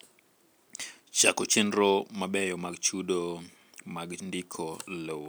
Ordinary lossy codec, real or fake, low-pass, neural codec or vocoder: none; real; none; none